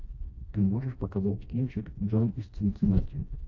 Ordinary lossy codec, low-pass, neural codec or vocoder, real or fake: AAC, 48 kbps; 7.2 kHz; codec, 16 kHz, 1 kbps, FreqCodec, smaller model; fake